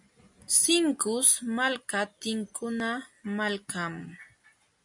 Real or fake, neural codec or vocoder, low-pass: real; none; 10.8 kHz